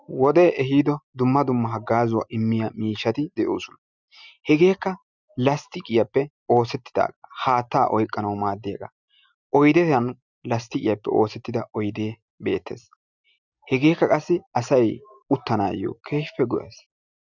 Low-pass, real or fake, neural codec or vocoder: 7.2 kHz; real; none